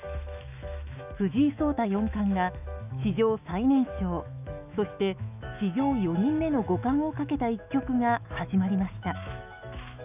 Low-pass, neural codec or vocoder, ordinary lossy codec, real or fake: 3.6 kHz; autoencoder, 48 kHz, 128 numbers a frame, DAC-VAE, trained on Japanese speech; none; fake